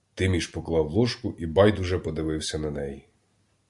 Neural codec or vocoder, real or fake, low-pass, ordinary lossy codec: none; real; 10.8 kHz; Opus, 64 kbps